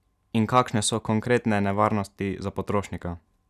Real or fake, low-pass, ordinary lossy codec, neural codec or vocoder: real; 14.4 kHz; none; none